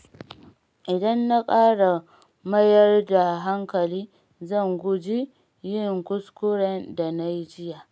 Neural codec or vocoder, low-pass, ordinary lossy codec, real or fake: none; none; none; real